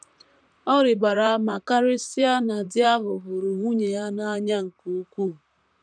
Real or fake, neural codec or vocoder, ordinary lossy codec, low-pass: fake; vocoder, 44.1 kHz, 128 mel bands, Pupu-Vocoder; none; 9.9 kHz